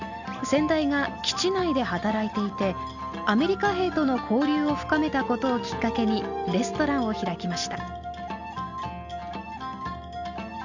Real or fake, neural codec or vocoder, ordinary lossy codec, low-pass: real; none; none; 7.2 kHz